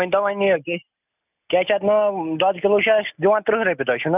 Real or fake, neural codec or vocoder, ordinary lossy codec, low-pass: real; none; none; 3.6 kHz